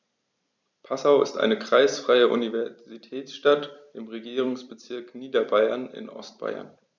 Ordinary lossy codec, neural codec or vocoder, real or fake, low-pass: none; vocoder, 44.1 kHz, 128 mel bands every 256 samples, BigVGAN v2; fake; 7.2 kHz